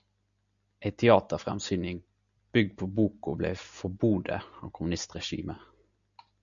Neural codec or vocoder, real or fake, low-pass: none; real; 7.2 kHz